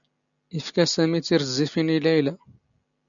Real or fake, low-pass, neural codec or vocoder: real; 7.2 kHz; none